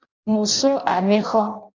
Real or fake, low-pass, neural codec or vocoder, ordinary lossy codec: fake; 7.2 kHz; codec, 16 kHz in and 24 kHz out, 0.6 kbps, FireRedTTS-2 codec; MP3, 64 kbps